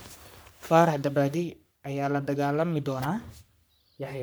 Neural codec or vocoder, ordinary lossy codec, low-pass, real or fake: codec, 44.1 kHz, 3.4 kbps, Pupu-Codec; none; none; fake